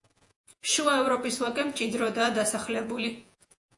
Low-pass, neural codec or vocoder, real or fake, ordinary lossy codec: 10.8 kHz; vocoder, 48 kHz, 128 mel bands, Vocos; fake; AAC, 64 kbps